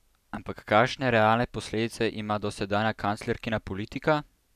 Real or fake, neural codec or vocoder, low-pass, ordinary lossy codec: real; none; 14.4 kHz; none